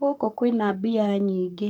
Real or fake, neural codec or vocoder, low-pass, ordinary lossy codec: fake; codec, 44.1 kHz, 7.8 kbps, Pupu-Codec; 19.8 kHz; none